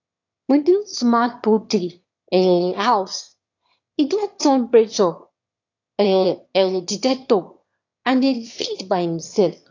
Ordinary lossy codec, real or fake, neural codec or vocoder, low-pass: AAC, 48 kbps; fake; autoencoder, 22.05 kHz, a latent of 192 numbers a frame, VITS, trained on one speaker; 7.2 kHz